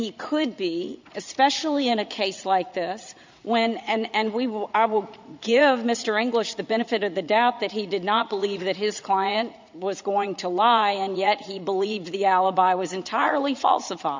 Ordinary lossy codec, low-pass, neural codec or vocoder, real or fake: MP3, 64 kbps; 7.2 kHz; vocoder, 44.1 kHz, 80 mel bands, Vocos; fake